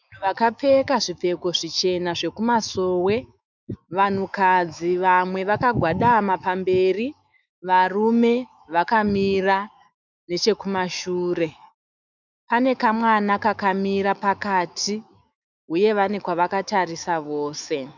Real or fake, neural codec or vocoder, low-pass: fake; autoencoder, 48 kHz, 128 numbers a frame, DAC-VAE, trained on Japanese speech; 7.2 kHz